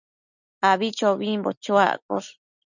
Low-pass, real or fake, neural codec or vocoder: 7.2 kHz; real; none